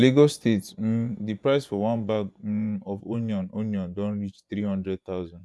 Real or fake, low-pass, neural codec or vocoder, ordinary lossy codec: fake; none; vocoder, 24 kHz, 100 mel bands, Vocos; none